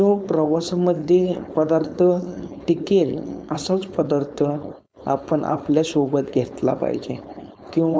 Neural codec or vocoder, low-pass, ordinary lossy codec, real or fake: codec, 16 kHz, 4.8 kbps, FACodec; none; none; fake